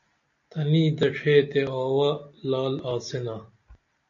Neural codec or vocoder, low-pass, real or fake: none; 7.2 kHz; real